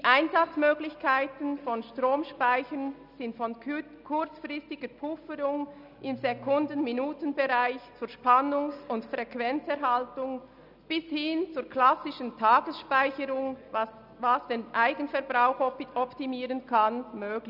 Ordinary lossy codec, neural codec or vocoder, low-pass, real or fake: none; none; 5.4 kHz; real